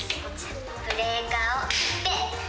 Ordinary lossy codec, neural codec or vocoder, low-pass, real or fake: none; none; none; real